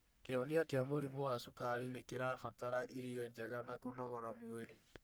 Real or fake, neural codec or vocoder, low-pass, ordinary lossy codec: fake; codec, 44.1 kHz, 1.7 kbps, Pupu-Codec; none; none